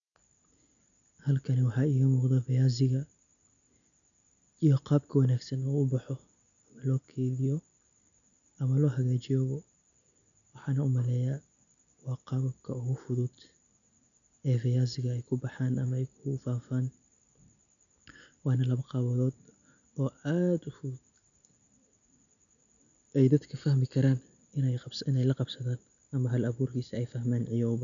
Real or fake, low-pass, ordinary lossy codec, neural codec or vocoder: real; 7.2 kHz; none; none